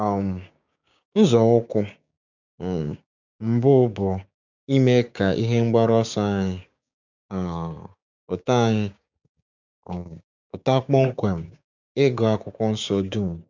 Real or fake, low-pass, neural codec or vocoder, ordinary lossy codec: fake; 7.2 kHz; codec, 16 kHz, 6 kbps, DAC; none